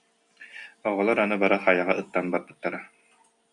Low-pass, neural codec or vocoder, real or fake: 10.8 kHz; none; real